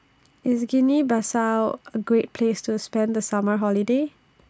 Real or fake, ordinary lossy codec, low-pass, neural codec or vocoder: real; none; none; none